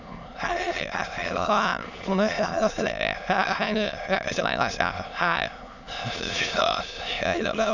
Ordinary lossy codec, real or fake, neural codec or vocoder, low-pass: none; fake; autoencoder, 22.05 kHz, a latent of 192 numbers a frame, VITS, trained on many speakers; 7.2 kHz